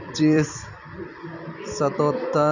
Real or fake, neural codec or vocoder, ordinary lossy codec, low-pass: fake; vocoder, 44.1 kHz, 128 mel bands every 256 samples, BigVGAN v2; none; 7.2 kHz